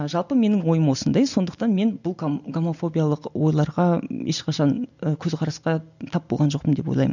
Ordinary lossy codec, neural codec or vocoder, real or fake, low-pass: none; none; real; 7.2 kHz